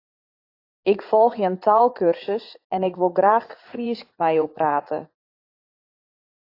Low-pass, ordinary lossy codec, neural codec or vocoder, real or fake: 5.4 kHz; AAC, 32 kbps; vocoder, 44.1 kHz, 80 mel bands, Vocos; fake